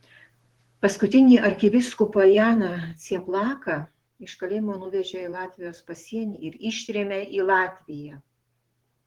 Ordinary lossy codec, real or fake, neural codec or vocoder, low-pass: Opus, 16 kbps; fake; vocoder, 44.1 kHz, 128 mel bands, Pupu-Vocoder; 19.8 kHz